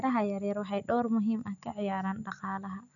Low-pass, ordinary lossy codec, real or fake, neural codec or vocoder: 7.2 kHz; none; real; none